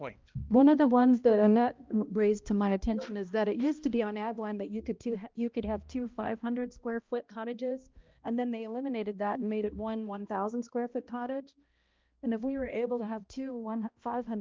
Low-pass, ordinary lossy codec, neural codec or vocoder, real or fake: 7.2 kHz; Opus, 24 kbps; codec, 16 kHz, 1 kbps, X-Codec, HuBERT features, trained on balanced general audio; fake